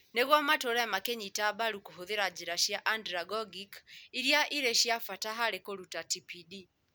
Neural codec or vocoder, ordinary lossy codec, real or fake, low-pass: none; none; real; none